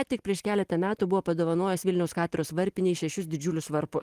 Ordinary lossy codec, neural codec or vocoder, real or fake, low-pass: Opus, 24 kbps; none; real; 14.4 kHz